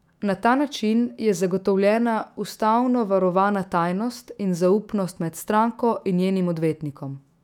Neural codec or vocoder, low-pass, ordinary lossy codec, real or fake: autoencoder, 48 kHz, 128 numbers a frame, DAC-VAE, trained on Japanese speech; 19.8 kHz; none; fake